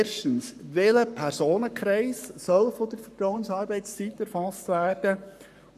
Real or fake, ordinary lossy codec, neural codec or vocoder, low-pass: fake; none; codec, 44.1 kHz, 7.8 kbps, Pupu-Codec; 14.4 kHz